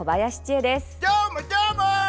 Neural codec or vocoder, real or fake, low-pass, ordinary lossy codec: none; real; none; none